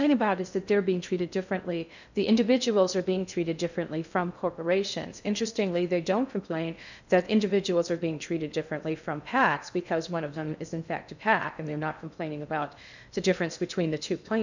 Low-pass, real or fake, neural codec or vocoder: 7.2 kHz; fake; codec, 16 kHz in and 24 kHz out, 0.6 kbps, FocalCodec, streaming, 2048 codes